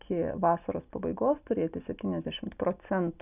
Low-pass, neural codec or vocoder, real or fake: 3.6 kHz; none; real